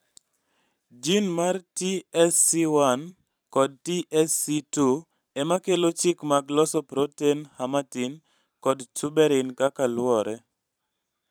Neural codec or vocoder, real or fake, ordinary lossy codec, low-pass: vocoder, 44.1 kHz, 128 mel bands every 512 samples, BigVGAN v2; fake; none; none